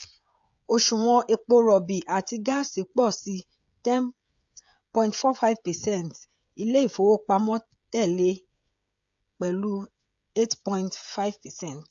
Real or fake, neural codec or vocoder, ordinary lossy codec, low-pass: fake; codec, 16 kHz, 16 kbps, FreqCodec, smaller model; AAC, 64 kbps; 7.2 kHz